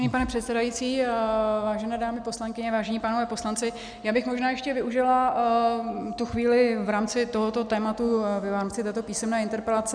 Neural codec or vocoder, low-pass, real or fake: none; 9.9 kHz; real